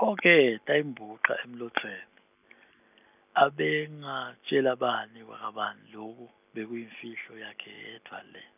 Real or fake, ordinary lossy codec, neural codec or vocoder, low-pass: real; none; none; 3.6 kHz